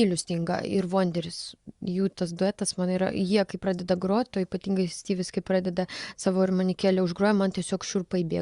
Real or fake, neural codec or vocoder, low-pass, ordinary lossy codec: fake; vocoder, 24 kHz, 100 mel bands, Vocos; 10.8 kHz; Opus, 64 kbps